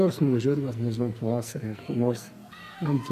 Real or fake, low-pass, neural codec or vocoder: fake; 14.4 kHz; codec, 44.1 kHz, 2.6 kbps, SNAC